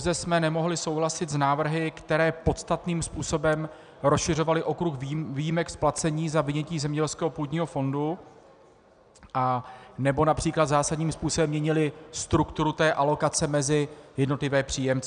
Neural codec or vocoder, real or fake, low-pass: none; real; 9.9 kHz